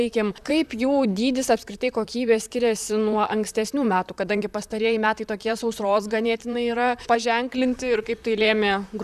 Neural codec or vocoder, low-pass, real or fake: vocoder, 44.1 kHz, 128 mel bands, Pupu-Vocoder; 14.4 kHz; fake